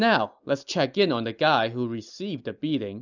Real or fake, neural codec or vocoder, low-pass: real; none; 7.2 kHz